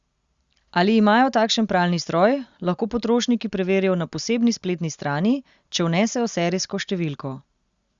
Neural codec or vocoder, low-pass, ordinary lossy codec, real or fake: none; 7.2 kHz; Opus, 64 kbps; real